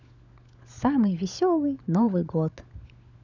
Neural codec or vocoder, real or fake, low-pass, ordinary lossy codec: codec, 16 kHz, 16 kbps, FunCodec, trained on LibriTTS, 50 frames a second; fake; 7.2 kHz; none